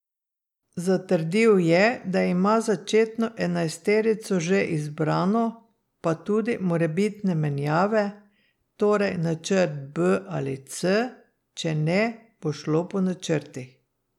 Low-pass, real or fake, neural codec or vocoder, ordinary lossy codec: 19.8 kHz; real; none; none